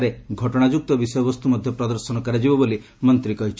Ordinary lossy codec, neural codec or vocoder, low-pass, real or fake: none; none; none; real